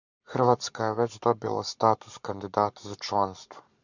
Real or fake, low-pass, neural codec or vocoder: fake; 7.2 kHz; codec, 44.1 kHz, 7.8 kbps, Pupu-Codec